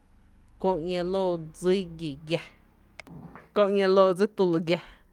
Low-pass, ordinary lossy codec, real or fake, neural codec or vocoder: 19.8 kHz; Opus, 32 kbps; fake; codec, 44.1 kHz, 7.8 kbps, DAC